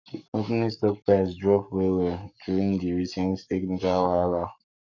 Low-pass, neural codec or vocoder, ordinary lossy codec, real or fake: 7.2 kHz; codec, 44.1 kHz, 7.8 kbps, Pupu-Codec; none; fake